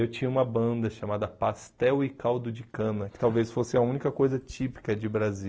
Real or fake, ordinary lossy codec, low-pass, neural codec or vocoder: real; none; none; none